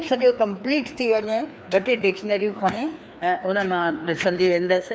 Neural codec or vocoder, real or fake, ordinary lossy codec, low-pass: codec, 16 kHz, 2 kbps, FreqCodec, larger model; fake; none; none